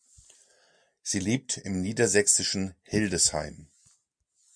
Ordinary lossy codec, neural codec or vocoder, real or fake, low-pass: MP3, 96 kbps; none; real; 9.9 kHz